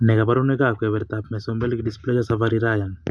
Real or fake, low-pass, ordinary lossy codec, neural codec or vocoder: real; none; none; none